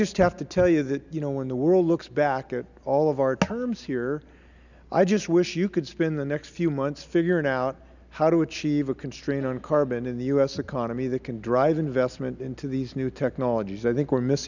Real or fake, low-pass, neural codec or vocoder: real; 7.2 kHz; none